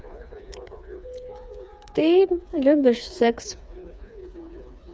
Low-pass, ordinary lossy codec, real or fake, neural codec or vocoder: none; none; fake; codec, 16 kHz, 4 kbps, FreqCodec, smaller model